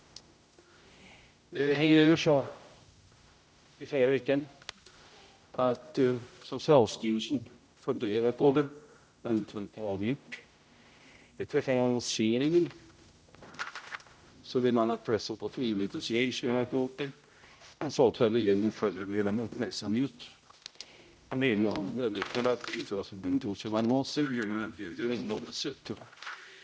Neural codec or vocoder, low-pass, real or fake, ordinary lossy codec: codec, 16 kHz, 0.5 kbps, X-Codec, HuBERT features, trained on general audio; none; fake; none